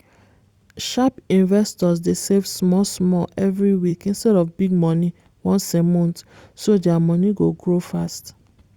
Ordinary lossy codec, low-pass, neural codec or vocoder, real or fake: Opus, 64 kbps; 19.8 kHz; vocoder, 44.1 kHz, 128 mel bands every 256 samples, BigVGAN v2; fake